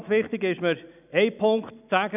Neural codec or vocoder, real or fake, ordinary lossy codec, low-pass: none; real; none; 3.6 kHz